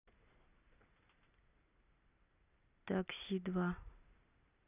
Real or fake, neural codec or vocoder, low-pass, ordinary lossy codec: real; none; 3.6 kHz; none